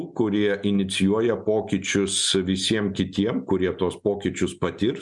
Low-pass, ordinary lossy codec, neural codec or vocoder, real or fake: 10.8 kHz; MP3, 96 kbps; none; real